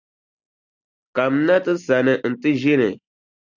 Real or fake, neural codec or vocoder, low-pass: real; none; 7.2 kHz